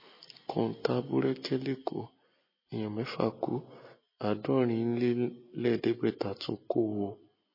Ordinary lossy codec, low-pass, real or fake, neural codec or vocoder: MP3, 24 kbps; 5.4 kHz; real; none